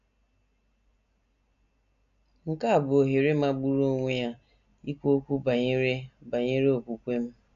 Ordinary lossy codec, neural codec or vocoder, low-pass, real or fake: none; none; 7.2 kHz; real